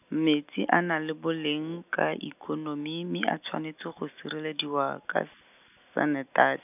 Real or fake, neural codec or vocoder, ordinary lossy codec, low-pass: real; none; none; 3.6 kHz